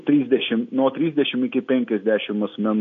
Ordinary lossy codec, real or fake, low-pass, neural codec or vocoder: AAC, 48 kbps; real; 7.2 kHz; none